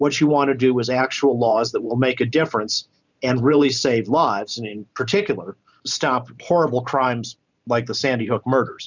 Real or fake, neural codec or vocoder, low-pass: fake; vocoder, 44.1 kHz, 128 mel bands every 512 samples, BigVGAN v2; 7.2 kHz